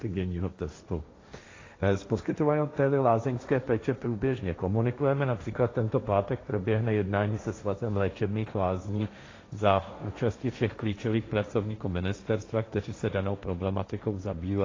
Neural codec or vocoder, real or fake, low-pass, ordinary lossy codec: codec, 16 kHz, 1.1 kbps, Voila-Tokenizer; fake; 7.2 kHz; AAC, 32 kbps